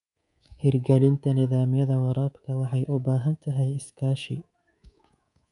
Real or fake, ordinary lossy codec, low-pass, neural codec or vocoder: fake; none; 10.8 kHz; codec, 24 kHz, 3.1 kbps, DualCodec